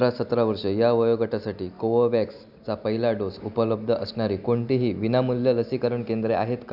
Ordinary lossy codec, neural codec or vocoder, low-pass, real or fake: none; none; 5.4 kHz; real